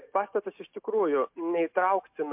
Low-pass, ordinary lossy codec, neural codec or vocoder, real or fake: 3.6 kHz; MP3, 32 kbps; none; real